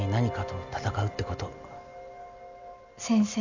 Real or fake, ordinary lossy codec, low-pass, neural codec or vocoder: real; none; 7.2 kHz; none